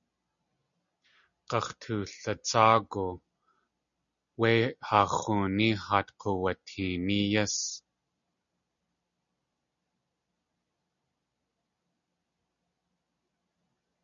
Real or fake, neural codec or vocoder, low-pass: real; none; 7.2 kHz